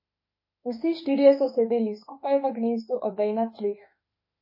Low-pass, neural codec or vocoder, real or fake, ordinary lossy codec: 5.4 kHz; autoencoder, 48 kHz, 32 numbers a frame, DAC-VAE, trained on Japanese speech; fake; MP3, 24 kbps